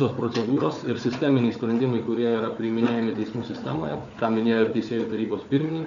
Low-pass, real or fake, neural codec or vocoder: 7.2 kHz; fake; codec, 16 kHz, 4 kbps, FunCodec, trained on Chinese and English, 50 frames a second